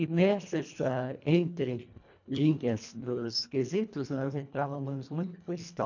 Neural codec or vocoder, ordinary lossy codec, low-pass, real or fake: codec, 24 kHz, 1.5 kbps, HILCodec; none; 7.2 kHz; fake